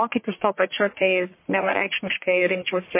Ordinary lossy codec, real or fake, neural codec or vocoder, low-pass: MP3, 24 kbps; fake; codec, 44.1 kHz, 1.7 kbps, Pupu-Codec; 3.6 kHz